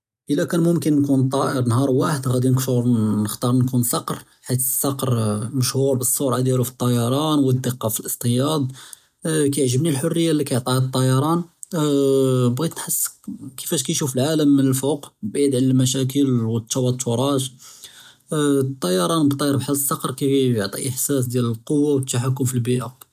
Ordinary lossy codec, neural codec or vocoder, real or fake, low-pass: none; none; real; 14.4 kHz